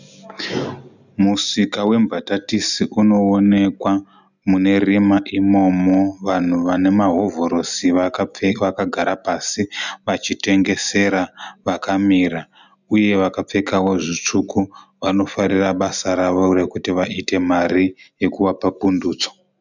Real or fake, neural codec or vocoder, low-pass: real; none; 7.2 kHz